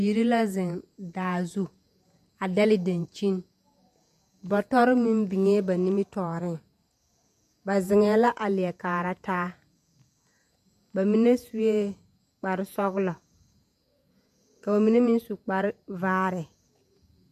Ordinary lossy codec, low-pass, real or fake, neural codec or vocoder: MP3, 96 kbps; 14.4 kHz; fake; vocoder, 48 kHz, 128 mel bands, Vocos